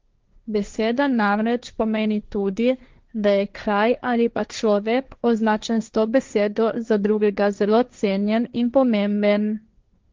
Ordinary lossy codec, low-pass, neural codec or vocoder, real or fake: Opus, 24 kbps; 7.2 kHz; codec, 16 kHz, 1.1 kbps, Voila-Tokenizer; fake